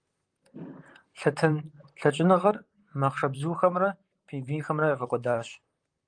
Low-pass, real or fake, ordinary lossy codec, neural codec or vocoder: 9.9 kHz; fake; Opus, 32 kbps; vocoder, 44.1 kHz, 128 mel bands, Pupu-Vocoder